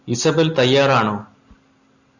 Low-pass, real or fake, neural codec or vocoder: 7.2 kHz; real; none